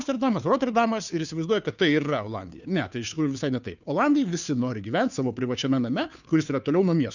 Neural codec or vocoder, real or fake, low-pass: codec, 16 kHz, 2 kbps, FunCodec, trained on Chinese and English, 25 frames a second; fake; 7.2 kHz